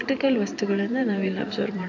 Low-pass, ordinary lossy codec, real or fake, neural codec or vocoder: 7.2 kHz; none; fake; vocoder, 44.1 kHz, 128 mel bands every 512 samples, BigVGAN v2